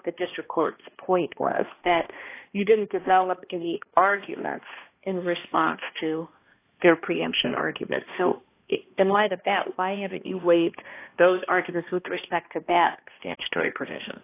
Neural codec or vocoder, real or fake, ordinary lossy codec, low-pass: codec, 16 kHz, 1 kbps, X-Codec, HuBERT features, trained on balanced general audio; fake; AAC, 24 kbps; 3.6 kHz